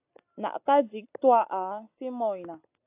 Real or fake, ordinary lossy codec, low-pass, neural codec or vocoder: real; AAC, 24 kbps; 3.6 kHz; none